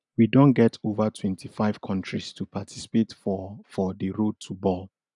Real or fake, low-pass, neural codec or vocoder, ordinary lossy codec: real; 10.8 kHz; none; none